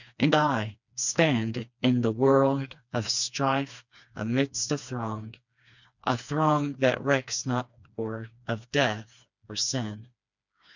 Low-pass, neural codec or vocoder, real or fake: 7.2 kHz; codec, 16 kHz, 2 kbps, FreqCodec, smaller model; fake